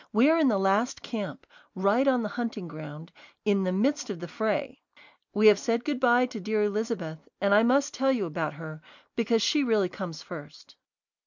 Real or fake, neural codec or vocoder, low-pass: real; none; 7.2 kHz